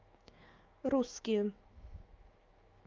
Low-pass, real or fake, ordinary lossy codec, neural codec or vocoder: 7.2 kHz; fake; Opus, 24 kbps; autoencoder, 48 kHz, 128 numbers a frame, DAC-VAE, trained on Japanese speech